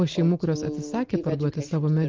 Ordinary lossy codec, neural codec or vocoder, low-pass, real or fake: Opus, 16 kbps; none; 7.2 kHz; real